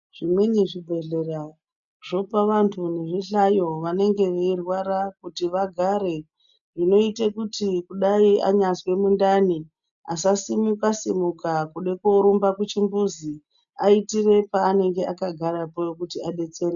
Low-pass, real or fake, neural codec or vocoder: 7.2 kHz; real; none